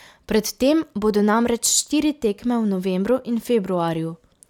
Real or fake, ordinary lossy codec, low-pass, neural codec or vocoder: real; none; 19.8 kHz; none